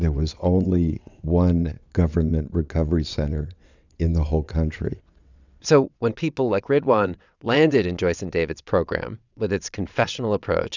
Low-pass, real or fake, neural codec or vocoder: 7.2 kHz; fake; vocoder, 22.05 kHz, 80 mel bands, WaveNeXt